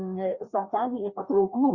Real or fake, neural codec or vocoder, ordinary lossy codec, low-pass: fake; codec, 16 kHz, 2 kbps, FreqCodec, larger model; Opus, 64 kbps; 7.2 kHz